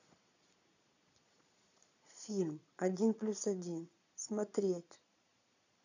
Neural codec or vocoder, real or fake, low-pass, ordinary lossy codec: vocoder, 22.05 kHz, 80 mel bands, Vocos; fake; 7.2 kHz; none